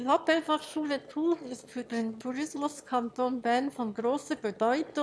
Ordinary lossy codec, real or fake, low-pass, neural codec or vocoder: none; fake; none; autoencoder, 22.05 kHz, a latent of 192 numbers a frame, VITS, trained on one speaker